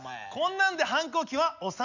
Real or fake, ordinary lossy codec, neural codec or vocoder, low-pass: real; none; none; 7.2 kHz